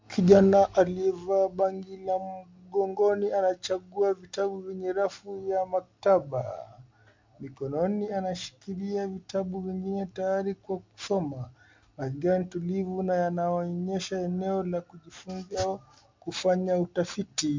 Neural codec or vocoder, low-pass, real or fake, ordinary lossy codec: none; 7.2 kHz; real; MP3, 64 kbps